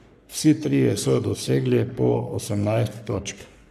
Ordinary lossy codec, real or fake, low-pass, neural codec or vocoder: none; fake; 14.4 kHz; codec, 44.1 kHz, 3.4 kbps, Pupu-Codec